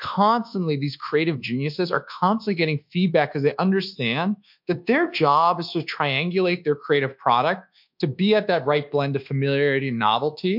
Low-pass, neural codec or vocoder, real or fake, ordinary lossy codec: 5.4 kHz; codec, 24 kHz, 1.2 kbps, DualCodec; fake; MP3, 48 kbps